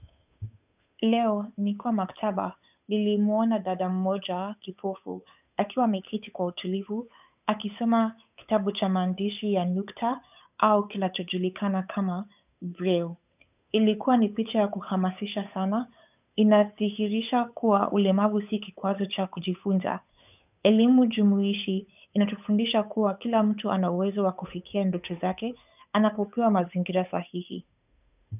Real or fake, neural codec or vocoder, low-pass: fake; codec, 16 kHz, 8 kbps, FunCodec, trained on Chinese and English, 25 frames a second; 3.6 kHz